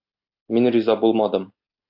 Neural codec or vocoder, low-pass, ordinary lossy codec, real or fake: none; 5.4 kHz; AAC, 48 kbps; real